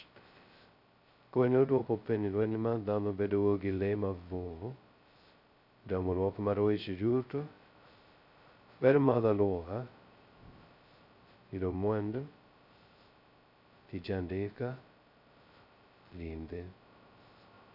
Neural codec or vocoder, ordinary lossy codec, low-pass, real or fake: codec, 16 kHz, 0.2 kbps, FocalCodec; none; 5.4 kHz; fake